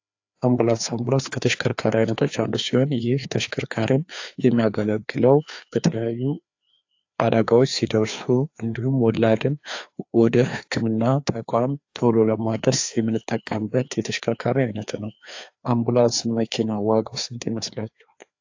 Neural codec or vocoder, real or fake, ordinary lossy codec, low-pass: codec, 16 kHz, 2 kbps, FreqCodec, larger model; fake; AAC, 48 kbps; 7.2 kHz